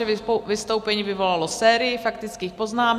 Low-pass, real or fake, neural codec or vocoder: 14.4 kHz; real; none